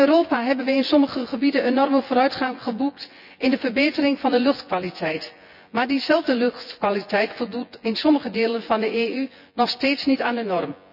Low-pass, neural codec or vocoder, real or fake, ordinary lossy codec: 5.4 kHz; vocoder, 24 kHz, 100 mel bands, Vocos; fake; none